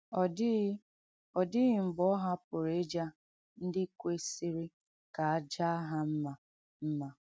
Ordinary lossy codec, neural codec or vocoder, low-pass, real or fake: none; none; none; real